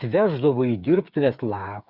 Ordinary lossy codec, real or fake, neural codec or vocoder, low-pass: Opus, 64 kbps; fake; codec, 16 kHz, 8 kbps, FreqCodec, smaller model; 5.4 kHz